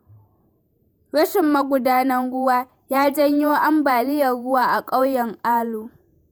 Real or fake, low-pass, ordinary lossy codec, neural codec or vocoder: fake; none; none; vocoder, 48 kHz, 128 mel bands, Vocos